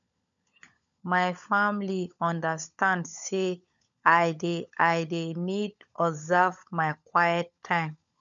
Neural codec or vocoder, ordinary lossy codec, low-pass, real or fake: codec, 16 kHz, 16 kbps, FunCodec, trained on LibriTTS, 50 frames a second; none; 7.2 kHz; fake